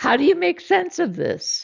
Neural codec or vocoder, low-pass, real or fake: none; 7.2 kHz; real